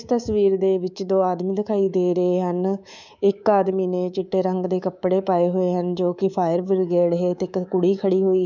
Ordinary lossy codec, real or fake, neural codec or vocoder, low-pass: none; fake; codec, 24 kHz, 3.1 kbps, DualCodec; 7.2 kHz